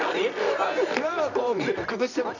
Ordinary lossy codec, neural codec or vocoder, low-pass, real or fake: none; codec, 24 kHz, 0.9 kbps, WavTokenizer, medium music audio release; 7.2 kHz; fake